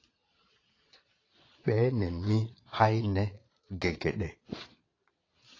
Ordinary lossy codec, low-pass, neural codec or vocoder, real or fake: AAC, 32 kbps; 7.2 kHz; vocoder, 22.05 kHz, 80 mel bands, Vocos; fake